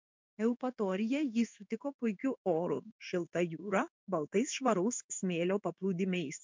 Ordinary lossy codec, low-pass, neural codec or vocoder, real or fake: MP3, 64 kbps; 7.2 kHz; codec, 16 kHz in and 24 kHz out, 1 kbps, XY-Tokenizer; fake